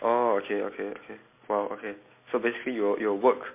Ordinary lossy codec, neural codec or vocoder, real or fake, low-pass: MP3, 24 kbps; none; real; 3.6 kHz